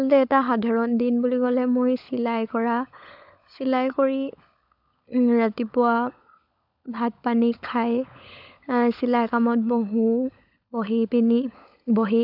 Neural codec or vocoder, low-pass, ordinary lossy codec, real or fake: codec, 16 kHz, 16 kbps, FunCodec, trained on LibriTTS, 50 frames a second; 5.4 kHz; AAC, 48 kbps; fake